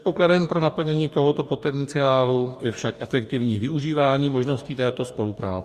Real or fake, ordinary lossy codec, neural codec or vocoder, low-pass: fake; Opus, 64 kbps; codec, 44.1 kHz, 2.6 kbps, DAC; 14.4 kHz